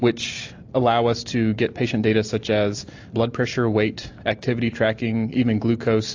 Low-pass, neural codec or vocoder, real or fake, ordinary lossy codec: 7.2 kHz; none; real; AAC, 48 kbps